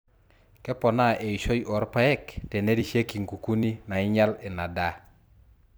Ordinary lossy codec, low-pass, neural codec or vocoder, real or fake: none; none; vocoder, 44.1 kHz, 128 mel bands every 256 samples, BigVGAN v2; fake